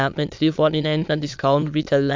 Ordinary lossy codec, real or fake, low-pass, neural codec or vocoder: MP3, 64 kbps; fake; 7.2 kHz; autoencoder, 22.05 kHz, a latent of 192 numbers a frame, VITS, trained on many speakers